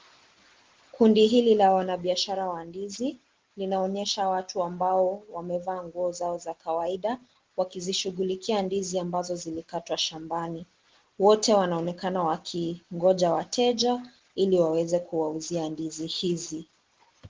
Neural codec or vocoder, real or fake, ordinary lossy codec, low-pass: none; real; Opus, 16 kbps; 7.2 kHz